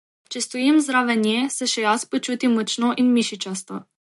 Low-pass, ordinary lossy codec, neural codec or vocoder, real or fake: 14.4 kHz; MP3, 48 kbps; none; real